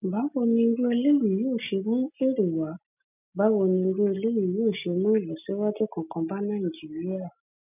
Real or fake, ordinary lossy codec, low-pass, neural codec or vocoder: real; none; 3.6 kHz; none